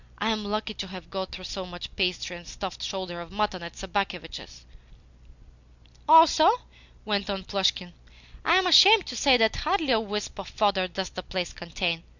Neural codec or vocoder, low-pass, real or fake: none; 7.2 kHz; real